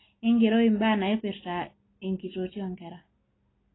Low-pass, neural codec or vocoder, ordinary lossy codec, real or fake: 7.2 kHz; none; AAC, 16 kbps; real